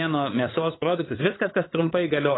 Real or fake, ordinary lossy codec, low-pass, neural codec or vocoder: fake; AAC, 16 kbps; 7.2 kHz; codec, 16 kHz, 8 kbps, FunCodec, trained on LibriTTS, 25 frames a second